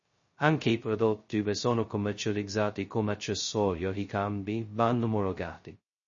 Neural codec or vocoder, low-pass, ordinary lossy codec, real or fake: codec, 16 kHz, 0.2 kbps, FocalCodec; 7.2 kHz; MP3, 32 kbps; fake